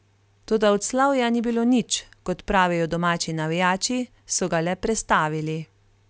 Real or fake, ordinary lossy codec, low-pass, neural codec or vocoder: real; none; none; none